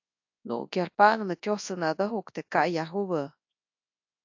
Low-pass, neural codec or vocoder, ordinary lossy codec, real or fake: 7.2 kHz; codec, 24 kHz, 0.9 kbps, WavTokenizer, large speech release; AAC, 48 kbps; fake